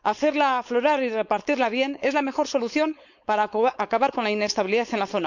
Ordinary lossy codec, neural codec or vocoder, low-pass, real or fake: none; codec, 16 kHz, 4.8 kbps, FACodec; 7.2 kHz; fake